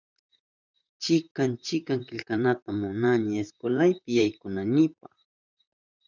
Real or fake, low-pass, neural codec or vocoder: fake; 7.2 kHz; vocoder, 44.1 kHz, 128 mel bands, Pupu-Vocoder